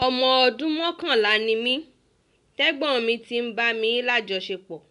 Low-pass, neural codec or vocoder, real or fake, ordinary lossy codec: 10.8 kHz; none; real; AAC, 96 kbps